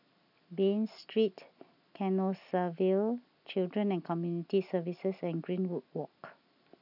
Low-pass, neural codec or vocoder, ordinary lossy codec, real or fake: 5.4 kHz; none; none; real